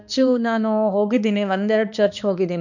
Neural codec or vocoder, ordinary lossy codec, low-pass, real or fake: codec, 16 kHz, 2 kbps, X-Codec, HuBERT features, trained on balanced general audio; none; 7.2 kHz; fake